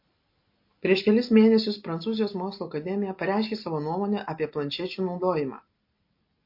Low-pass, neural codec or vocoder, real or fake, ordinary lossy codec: 5.4 kHz; none; real; MP3, 32 kbps